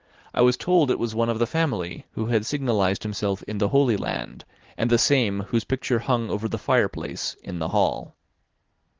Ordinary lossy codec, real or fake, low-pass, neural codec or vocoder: Opus, 16 kbps; real; 7.2 kHz; none